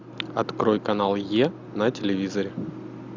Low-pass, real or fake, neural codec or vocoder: 7.2 kHz; real; none